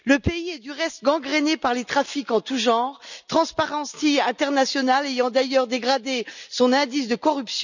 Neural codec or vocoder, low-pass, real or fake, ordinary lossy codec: none; 7.2 kHz; real; none